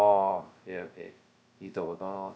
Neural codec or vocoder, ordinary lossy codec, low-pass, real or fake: codec, 16 kHz, 0.2 kbps, FocalCodec; none; none; fake